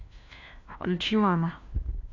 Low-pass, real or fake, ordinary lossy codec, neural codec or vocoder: 7.2 kHz; fake; AAC, 48 kbps; codec, 16 kHz, 1 kbps, FunCodec, trained on LibriTTS, 50 frames a second